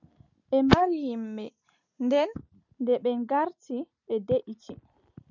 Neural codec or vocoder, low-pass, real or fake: none; 7.2 kHz; real